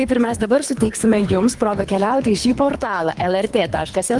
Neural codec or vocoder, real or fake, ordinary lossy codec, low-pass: codec, 24 kHz, 3 kbps, HILCodec; fake; Opus, 32 kbps; 10.8 kHz